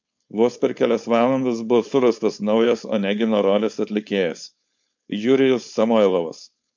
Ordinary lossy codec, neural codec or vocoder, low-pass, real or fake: MP3, 64 kbps; codec, 16 kHz, 4.8 kbps, FACodec; 7.2 kHz; fake